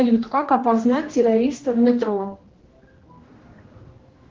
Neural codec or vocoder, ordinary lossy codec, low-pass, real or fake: codec, 16 kHz, 1 kbps, X-Codec, HuBERT features, trained on general audio; Opus, 16 kbps; 7.2 kHz; fake